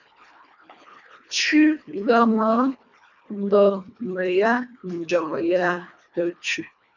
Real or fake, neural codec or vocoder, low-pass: fake; codec, 24 kHz, 1.5 kbps, HILCodec; 7.2 kHz